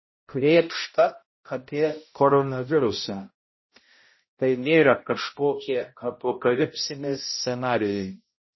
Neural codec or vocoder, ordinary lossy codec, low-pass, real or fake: codec, 16 kHz, 0.5 kbps, X-Codec, HuBERT features, trained on balanced general audio; MP3, 24 kbps; 7.2 kHz; fake